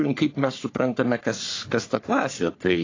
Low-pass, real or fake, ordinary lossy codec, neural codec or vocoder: 7.2 kHz; fake; AAC, 32 kbps; codec, 44.1 kHz, 3.4 kbps, Pupu-Codec